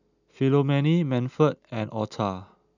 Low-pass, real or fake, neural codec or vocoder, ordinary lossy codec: 7.2 kHz; real; none; none